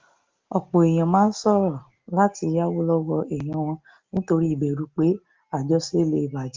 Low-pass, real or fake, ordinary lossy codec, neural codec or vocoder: 7.2 kHz; real; Opus, 24 kbps; none